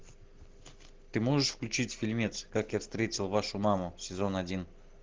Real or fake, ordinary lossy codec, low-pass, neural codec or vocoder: real; Opus, 16 kbps; 7.2 kHz; none